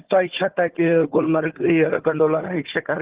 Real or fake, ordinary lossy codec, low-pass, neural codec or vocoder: fake; Opus, 16 kbps; 3.6 kHz; codec, 16 kHz, 4 kbps, FunCodec, trained on Chinese and English, 50 frames a second